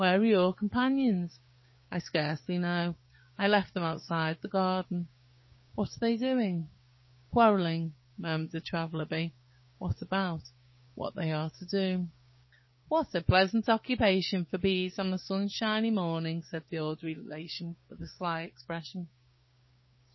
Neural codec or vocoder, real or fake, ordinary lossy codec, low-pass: codec, 16 kHz, 6 kbps, DAC; fake; MP3, 24 kbps; 7.2 kHz